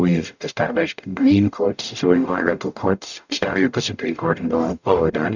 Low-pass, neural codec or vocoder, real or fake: 7.2 kHz; codec, 44.1 kHz, 0.9 kbps, DAC; fake